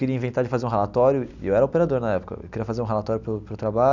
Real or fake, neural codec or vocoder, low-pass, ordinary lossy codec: real; none; 7.2 kHz; none